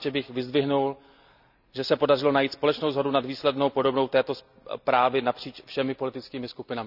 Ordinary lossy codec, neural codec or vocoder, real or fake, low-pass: none; none; real; 5.4 kHz